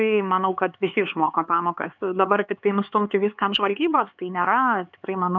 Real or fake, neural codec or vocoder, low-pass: fake; codec, 16 kHz, 4 kbps, X-Codec, HuBERT features, trained on LibriSpeech; 7.2 kHz